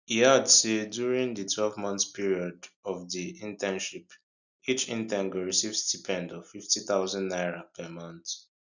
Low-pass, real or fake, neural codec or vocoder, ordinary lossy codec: 7.2 kHz; real; none; none